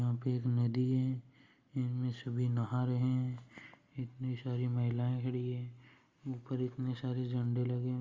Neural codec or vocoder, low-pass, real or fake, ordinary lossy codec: none; 7.2 kHz; real; Opus, 32 kbps